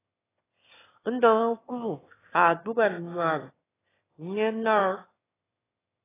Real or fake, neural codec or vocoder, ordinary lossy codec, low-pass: fake; autoencoder, 22.05 kHz, a latent of 192 numbers a frame, VITS, trained on one speaker; AAC, 16 kbps; 3.6 kHz